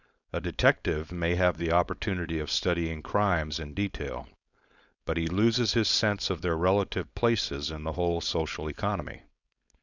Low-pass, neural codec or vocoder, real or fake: 7.2 kHz; codec, 16 kHz, 4.8 kbps, FACodec; fake